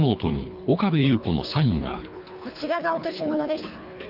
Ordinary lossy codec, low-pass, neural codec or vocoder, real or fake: AAC, 48 kbps; 5.4 kHz; codec, 24 kHz, 3 kbps, HILCodec; fake